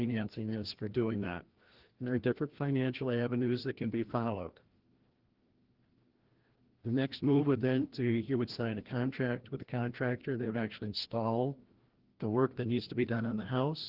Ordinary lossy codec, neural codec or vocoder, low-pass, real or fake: Opus, 16 kbps; codec, 16 kHz, 1 kbps, FreqCodec, larger model; 5.4 kHz; fake